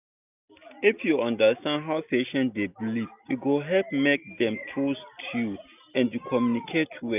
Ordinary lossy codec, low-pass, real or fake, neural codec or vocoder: none; 3.6 kHz; real; none